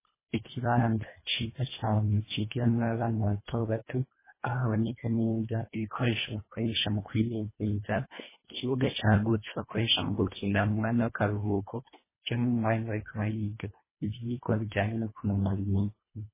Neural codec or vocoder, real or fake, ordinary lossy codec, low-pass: codec, 24 kHz, 1.5 kbps, HILCodec; fake; MP3, 16 kbps; 3.6 kHz